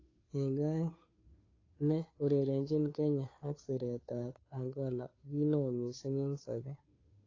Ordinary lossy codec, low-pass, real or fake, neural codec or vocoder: AAC, 32 kbps; 7.2 kHz; fake; codec, 16 kHz, 2 kbps, FunCodec, trained on Chinese and English, 25 frames a second